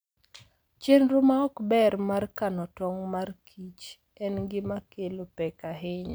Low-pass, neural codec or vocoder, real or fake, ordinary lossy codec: none; none; real; none